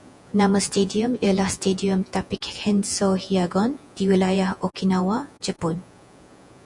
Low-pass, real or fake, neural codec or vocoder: 10.8 kHz; fake; vocoder, 48 kHz, 128 mel bands, Vocos